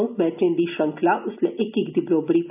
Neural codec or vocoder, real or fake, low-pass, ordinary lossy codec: none; real; 3.6 kHz; none